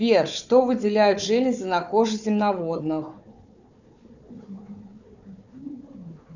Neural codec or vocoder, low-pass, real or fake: codec, 16 kHz, 4 kbps, FunCodec, trained on Chinese and English, 50 frames a second; 7.2 kHz; fake